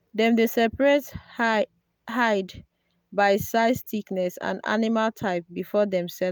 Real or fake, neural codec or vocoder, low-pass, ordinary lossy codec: real; none; none; none